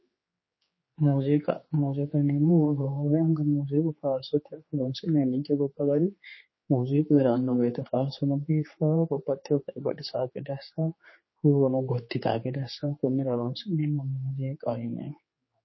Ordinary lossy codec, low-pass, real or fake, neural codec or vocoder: MP3, 24 kbps; 7.2 kHz; fake; codec, 16 kHz, 4 kbps, X-Codec, HuBERT features, trained on general audio